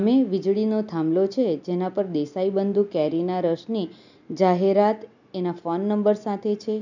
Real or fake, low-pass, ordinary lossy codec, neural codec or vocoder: real; 7.2 kHz; none; none